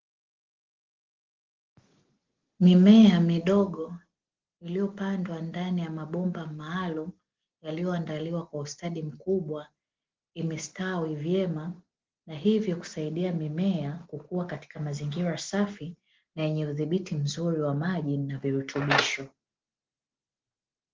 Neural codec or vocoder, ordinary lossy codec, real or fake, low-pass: none; Opus, 16 kbps; real; 7.2 kHz